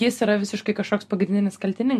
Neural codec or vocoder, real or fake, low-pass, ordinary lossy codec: none; real; 14.4 kHz; MP3, 64 kbps